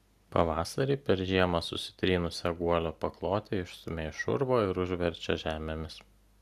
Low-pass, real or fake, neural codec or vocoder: 14.4 kHz; real; none